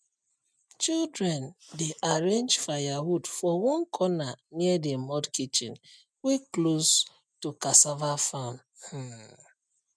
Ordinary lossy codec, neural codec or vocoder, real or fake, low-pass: none; none; real; none